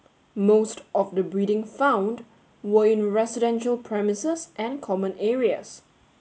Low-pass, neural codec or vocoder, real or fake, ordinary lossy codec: none; none; real; none